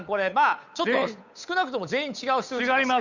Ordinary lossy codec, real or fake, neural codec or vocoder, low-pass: none; fake; codec, 16 kHz, 8 kbps, FunCodec, trained on Chinese and English, 25 frames a second; 7.2 kHz